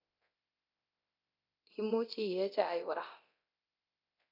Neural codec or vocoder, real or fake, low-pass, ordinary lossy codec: codec, 24 kHz, 0.9 kbps, DualCodec; fake; 5.4 kHz; AAC, 48 kbps